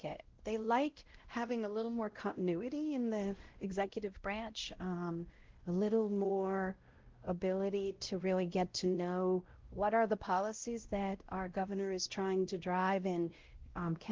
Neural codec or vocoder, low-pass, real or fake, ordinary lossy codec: codec, 16 kHz, 0.5 kbps, X-Codec, WavLM features, trained on Multilingual LibriSpeech; 7.2 kHz; fake; Opus, 16 kbps